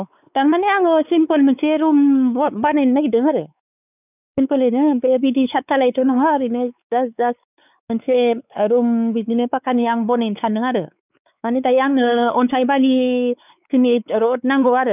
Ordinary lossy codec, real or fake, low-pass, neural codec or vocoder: none; fake; 3.6 kHz; codec, 16 kHz, 4 kbps, X-Codec, WavLM features, trained on Multilingual LibriSpeech